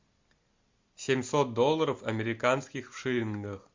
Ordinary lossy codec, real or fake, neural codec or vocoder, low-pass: MP3, 64 kbps; real; none; 7.2 kHz